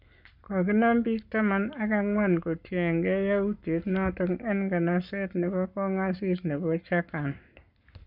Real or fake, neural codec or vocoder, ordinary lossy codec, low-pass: fake; codec, 44.1 kHz, 7.8 kbps, Pupu-Codec; none; 5.4 kHz